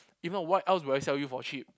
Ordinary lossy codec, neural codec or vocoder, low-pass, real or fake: none; none; none; real